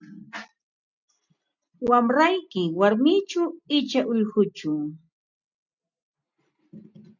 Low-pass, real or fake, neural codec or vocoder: 7.2 kHz; real; none